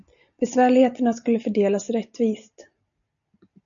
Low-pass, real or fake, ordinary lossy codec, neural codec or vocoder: 7.2 kHz; real; MP3, 96 kbps; none